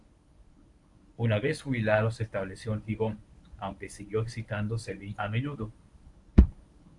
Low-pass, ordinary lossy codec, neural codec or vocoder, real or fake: 10.8 kHz; AAC, 64 kbps; codec, 24 kHz, 0.9 kbps, WavTokenizer, medium speech release version 1; fake